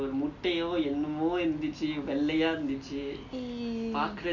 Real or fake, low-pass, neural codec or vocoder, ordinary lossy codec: real; 7.2 kHz; none; none